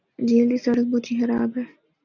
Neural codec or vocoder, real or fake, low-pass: none; real; 7.2 kHz